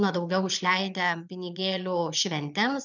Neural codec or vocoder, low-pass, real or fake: vocoder, 22.05 kHz, 80 mel bands, Vocos; 7.2 kHz; fake